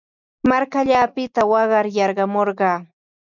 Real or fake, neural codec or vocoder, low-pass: real; none; 7.2 kHz